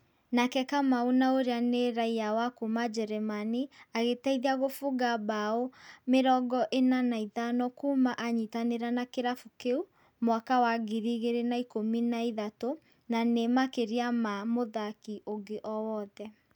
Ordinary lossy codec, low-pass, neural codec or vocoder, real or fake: none; 19.8 kHz; none; real